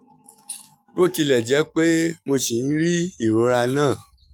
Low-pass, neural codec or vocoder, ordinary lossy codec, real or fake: 19.8 kHz; codec, 44.1 kHz, 7.8 kbps, DAC; none; fake